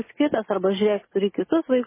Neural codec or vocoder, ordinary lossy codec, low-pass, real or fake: none; MP3, 16 kbps; 3.6 kHz; real